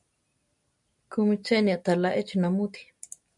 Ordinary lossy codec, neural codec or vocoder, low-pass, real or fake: MP3, 96 kbps; none; 10.8 kHz; real